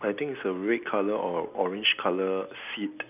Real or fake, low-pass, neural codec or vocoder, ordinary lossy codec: real; 3.6 kHz; none; none